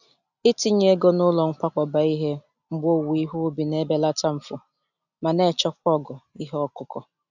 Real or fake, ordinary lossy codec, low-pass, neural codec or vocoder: real; none; 7.2 kHz; none